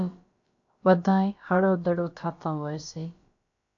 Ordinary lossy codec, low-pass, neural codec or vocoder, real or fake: AAC, 48 kbps; 7.2 kHz; codec, 16 kHz, about 1 kbps, DyCAST, with the encoder's durations; fake